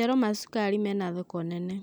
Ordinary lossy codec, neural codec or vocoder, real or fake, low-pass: none; none; real; none